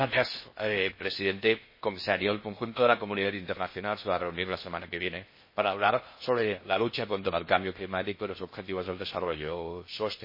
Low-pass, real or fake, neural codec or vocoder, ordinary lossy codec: 5.4 kHz; fake; codec, 16 kHz in and 24 kHz out, 0.6 kbps, FocalCodec, streaming, 4096 codes; MP3, 24 kbps